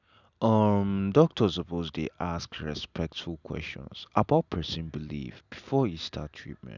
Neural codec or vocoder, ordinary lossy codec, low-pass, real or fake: none; none; 7.2 kHz; real